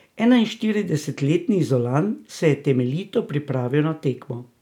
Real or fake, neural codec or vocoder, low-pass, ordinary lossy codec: real; none; 19.8 kHz; none